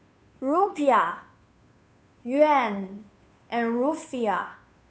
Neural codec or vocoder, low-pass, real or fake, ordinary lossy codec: codec, 16 kHz, 2 kbps, FunCodec, trained on Chinese and English, 25 frames a second; none; fake; none